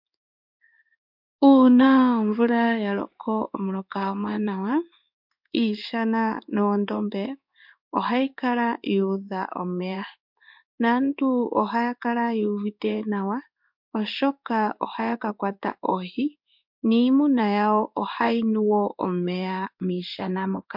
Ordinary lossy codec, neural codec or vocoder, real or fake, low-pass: MP3, 48 kbps; codec, 16 kHz in and 24 kHz out, 1 kbps, XY-Tokenizer; fake; 5.4 kHz